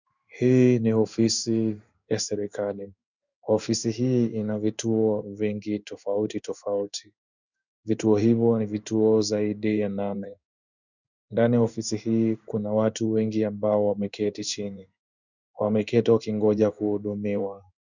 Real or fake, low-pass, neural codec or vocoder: fake; 7.2 kHz; codec, 16 kHz in and 24 kHz out, 1 kbps, XY-Tokenizer